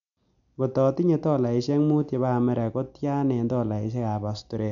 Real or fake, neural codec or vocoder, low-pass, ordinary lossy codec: real; none; 7.2 kHz; none